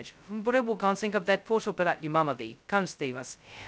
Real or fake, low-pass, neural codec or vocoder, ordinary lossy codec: fake; none; codec, 16 kHz, 0.2 kbps, FocalCodec; none